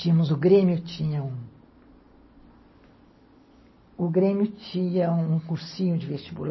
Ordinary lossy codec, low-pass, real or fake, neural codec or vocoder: MP3, 24 kbps; 7.2 kHz; real; none